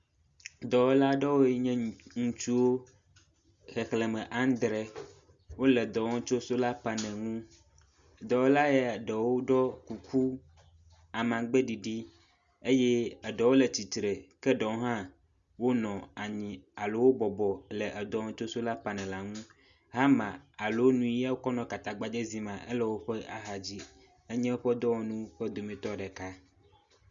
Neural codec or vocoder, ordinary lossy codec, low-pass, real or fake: none; Opus, 64 kbps; 7.2 kHz; real